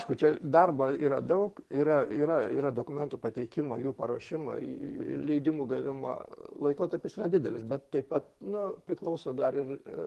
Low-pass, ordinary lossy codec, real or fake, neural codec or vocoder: 14.4 kHz; Opus, 24 kbps; fake; codec, 44.1 kHz, 2.6 kbps, SNAC